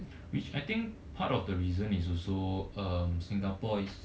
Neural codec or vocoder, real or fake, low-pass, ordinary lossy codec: none; real; none; none